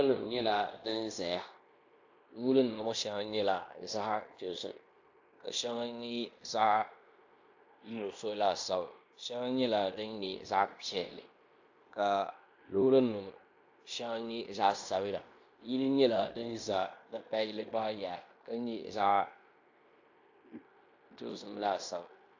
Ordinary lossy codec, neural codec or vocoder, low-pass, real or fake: AAC, 48 kbps; codec, 16 kHz in and 24 kHz out, 0.9 kbps, LongCat-Audio-Codec, fine tuned four codebook decoder; 7.2 kHz; fake